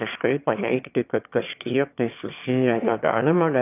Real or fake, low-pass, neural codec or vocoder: fake; 3.6 kHz; autoencoder, 22.05 kHz, a latent of 192 numbers a frame, VITS, trained on one speaker